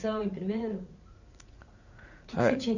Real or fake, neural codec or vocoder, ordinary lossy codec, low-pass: fake; vocoder, 44.1 kHz, 80 mel bands, Vocos; none; 7.2 kHz